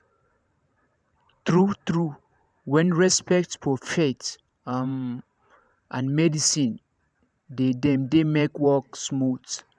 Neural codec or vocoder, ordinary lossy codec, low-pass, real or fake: vocoder, 44.1 kHz, 128 mel bands every 512 samples, BigVGAN v2; none; 9.9 kHz; fake